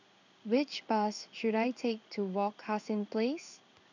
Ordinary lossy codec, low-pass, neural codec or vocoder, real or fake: none; 7.2 kHz; codec, 16 kHz in and 24 kHz out, 1 kbps, XY-Tokenizer; fake